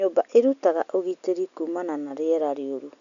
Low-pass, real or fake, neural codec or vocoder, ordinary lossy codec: 7.2 kHz; real; none; none